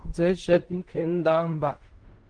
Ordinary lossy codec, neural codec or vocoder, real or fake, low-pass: Opus, 24 kbps; codec, 16 kHz in and 24 kHz out, 0.4 kbps, LongCat-Audio-Codec, fine tuned four codebook decoder; fake; 9.9 kHz